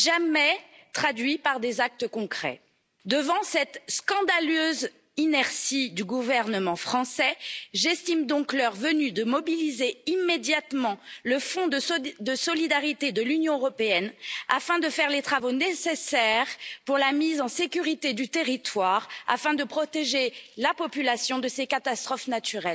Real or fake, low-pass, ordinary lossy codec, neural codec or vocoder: real; none; none; none